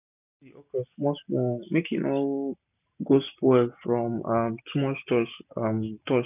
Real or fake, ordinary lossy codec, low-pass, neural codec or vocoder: real; none; 3.6 kHz; none